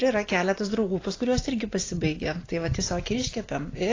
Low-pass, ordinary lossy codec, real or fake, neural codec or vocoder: 7.2 kHz; AAC, 32 kbps; real; none